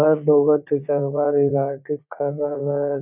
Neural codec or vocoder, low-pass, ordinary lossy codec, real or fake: vocoder, 44.1 kHz, 80 mel bands, Vocos; 3.6 kHz; none; fake